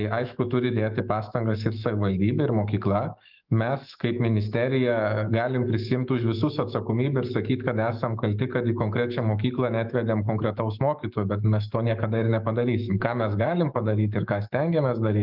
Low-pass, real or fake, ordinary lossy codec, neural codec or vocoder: 5.4 kHz; fake; Opus, 24 kbps; autoencoder, 48 kHz, 128 numbers a frame, DAC-VAE, trained on Japanese speech